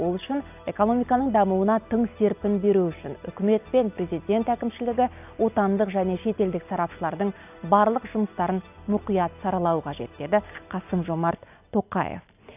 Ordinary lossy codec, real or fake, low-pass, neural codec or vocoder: none; real; 3.6 kHz; none